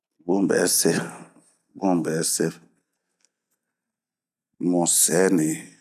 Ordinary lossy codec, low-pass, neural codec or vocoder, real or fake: none; 14.4 kHz; none; real